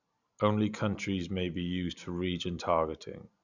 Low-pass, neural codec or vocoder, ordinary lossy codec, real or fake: 7.2 kHz; none; none; real